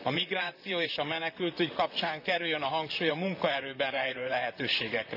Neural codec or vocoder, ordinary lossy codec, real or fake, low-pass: vocoder, 44.1 kHz, 128 mel bands, Pupu-Vocoder; none; fake; 5.4 kHz